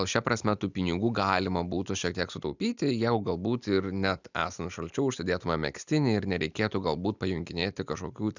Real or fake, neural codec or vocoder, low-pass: real; none; 7.2 kHz